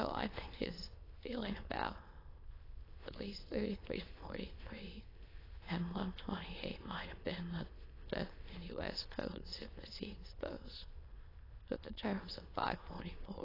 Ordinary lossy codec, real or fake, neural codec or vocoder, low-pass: AAC, 24 kbps; fake; autoencoder, 22.05 kHz, a latent of 192 numbers a frame, VITS, trained on many speakers; 5.4 kHz